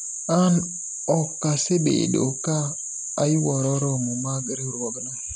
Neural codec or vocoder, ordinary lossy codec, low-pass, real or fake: none; none; none; real